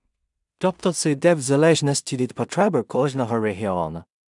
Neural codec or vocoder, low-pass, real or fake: codec, 16 kHz in and 24 kHz out, 0.4 kbps, LongCat-Audio-Codec, two codebook decoder; 10.8 kHz; fake